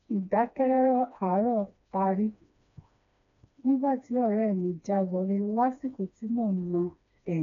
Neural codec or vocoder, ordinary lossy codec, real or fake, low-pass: codec, 16 kHz, 2 kbps, FreqCodec, smaller model; none; fake; 7.2 kHz